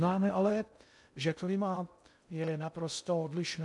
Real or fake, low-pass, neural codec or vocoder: fake; 10.8 kHz; codec, 16 kHz in and 24 kHz out, 0.6 kbps, FocalCodec, streaming, 2048 codes